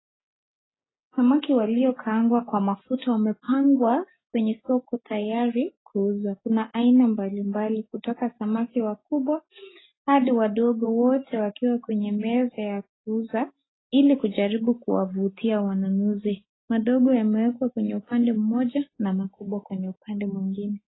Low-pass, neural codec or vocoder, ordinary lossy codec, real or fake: 7.2 kHz; none; AAC, 16 kbps; real